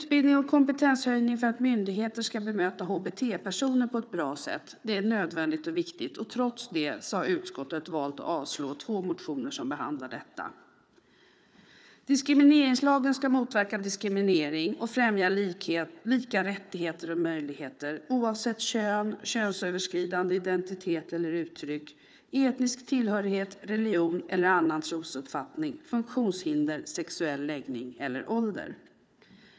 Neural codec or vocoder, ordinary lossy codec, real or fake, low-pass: codec, 16 kHz, 4 kbps, FunCodec, trained on Chinese and English, 50 frames a second; none; fake; none